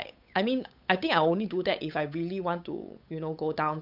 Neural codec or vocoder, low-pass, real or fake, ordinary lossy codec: codec, 16 kHz, 8 kbps, FunCodec, trained on Chinese and English, 25 frames a second; 5.4 kHz; fake; none